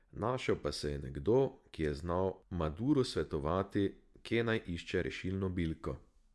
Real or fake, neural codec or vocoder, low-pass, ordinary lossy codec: fake; vocoder, 24 kHz, 100 mel bands, Vocos; none; none